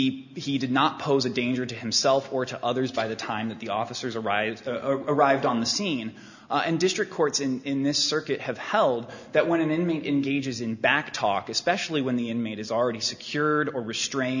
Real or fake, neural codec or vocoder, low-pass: real; none; 7.2 kHz